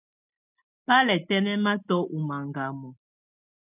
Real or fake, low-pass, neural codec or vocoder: real; 3.6 kHz; none